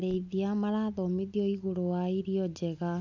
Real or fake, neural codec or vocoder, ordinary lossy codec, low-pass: real; none; none; 7.2 kHz